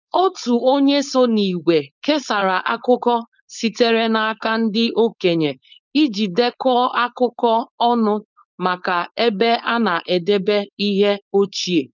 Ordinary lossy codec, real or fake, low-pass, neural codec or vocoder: none; fake; 7.2 kHz; codec, 16 kHz, 4.8 kbps, FACodec